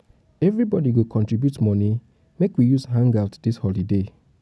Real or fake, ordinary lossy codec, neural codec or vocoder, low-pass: real; none; none; none